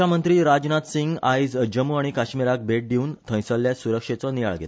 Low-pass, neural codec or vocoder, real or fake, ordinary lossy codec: none; none; real; none